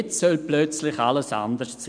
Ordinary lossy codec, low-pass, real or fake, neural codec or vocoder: none; 9.9 kHz; real; none